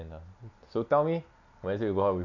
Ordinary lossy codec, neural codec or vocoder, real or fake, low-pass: none; none; real; 7.2 kHz